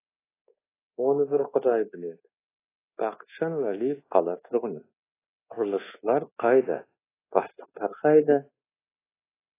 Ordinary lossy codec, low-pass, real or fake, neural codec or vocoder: AAC, 16 kbps; 3.6 kHz; fake; codec, 24 kHz, 1.2 kbps, DualCodec